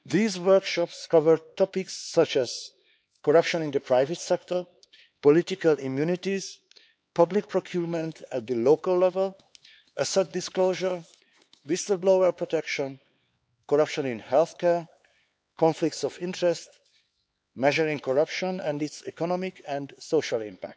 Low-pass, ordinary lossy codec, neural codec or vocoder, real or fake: none; none; codec, 16 kHz, 4 kbps, X-Codec, HuBERT features, trained on LibriSpeech; fake